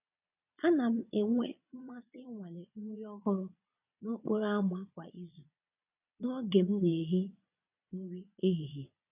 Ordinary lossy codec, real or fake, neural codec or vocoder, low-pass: none; fake; vocoder, 22.05 kHz, 80 mel bands, Vocos; 3.6 kHz